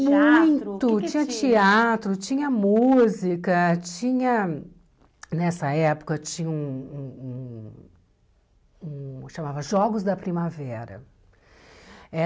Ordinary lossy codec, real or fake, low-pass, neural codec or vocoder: none; real; none; none